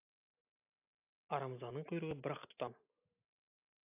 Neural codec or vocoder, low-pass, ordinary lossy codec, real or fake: none; 3.6 kHz; AAC, 32 kbps; real